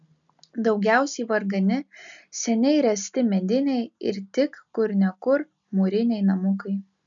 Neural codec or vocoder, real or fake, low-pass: none; real; 7.2 kHz